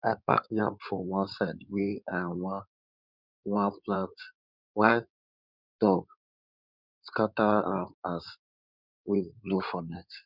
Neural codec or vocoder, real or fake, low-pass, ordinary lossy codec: codec, 16 kHz in and 24 kHz out, 2.2 kbps, FireRedTTS-2 codec; fake; 5.4 kHz; none